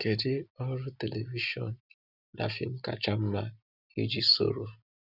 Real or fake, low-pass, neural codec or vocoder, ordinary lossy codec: real; 5.4 kHz; none; Opus, 64 kbps